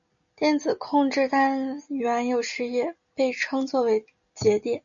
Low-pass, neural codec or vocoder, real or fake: 7.2 kHz; none; real